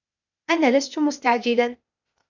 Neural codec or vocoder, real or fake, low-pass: codec, 16 kHz, 0.8 kbps, ZipCodec; fake; 7.2 kHz